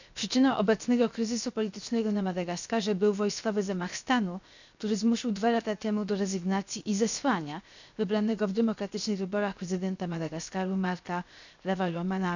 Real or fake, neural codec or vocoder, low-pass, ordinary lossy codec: fake; codec, 16 kHz, 0.7 kbps, FocalCodec; 7.2 kHz; none